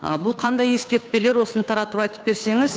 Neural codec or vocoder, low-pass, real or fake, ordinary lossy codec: codec, 16 kHz, 2 kbps, FunCodec, trained on Chinese and English, 25 frames a second; none; fake; none